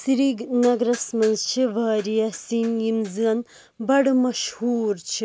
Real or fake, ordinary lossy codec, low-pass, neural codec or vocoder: real; none; none; none